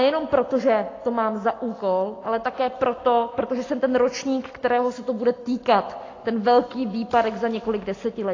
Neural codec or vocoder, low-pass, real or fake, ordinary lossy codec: none; 7.2 kHz; real; AAC, 32 kbps